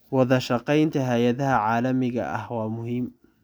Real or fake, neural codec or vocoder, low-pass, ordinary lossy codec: real; none; none; none